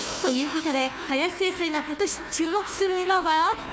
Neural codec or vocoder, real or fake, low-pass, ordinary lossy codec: codec, 16 kHz, 1 kbps, FunCodec, trained on Chinese and English, 50 frames a second; fake; none; none